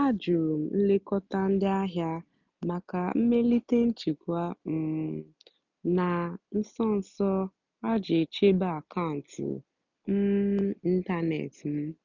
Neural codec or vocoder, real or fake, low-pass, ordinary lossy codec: none; real; 7.2 kHz; none